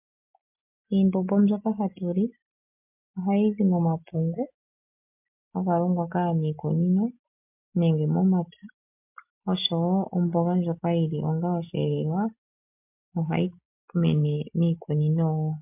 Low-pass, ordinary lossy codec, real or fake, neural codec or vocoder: 3.6 kHz; MP3, 32 kbps; real; none